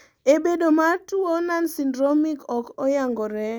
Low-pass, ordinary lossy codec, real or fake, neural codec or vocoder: none; none; real; none